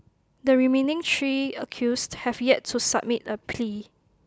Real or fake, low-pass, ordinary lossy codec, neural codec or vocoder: real; none; none; none